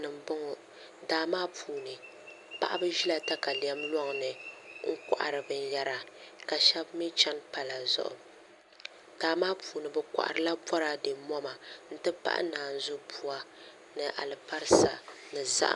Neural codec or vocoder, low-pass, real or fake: none; 10.8 kHz; real